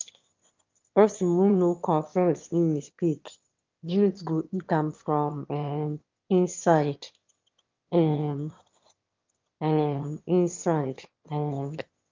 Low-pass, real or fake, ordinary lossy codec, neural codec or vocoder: 7.2 kHz; fake; Opus, 32 kbps; autoencoder, 22.05 kHz, a latent of 192 numbers a frame, VITS, trained on one speaker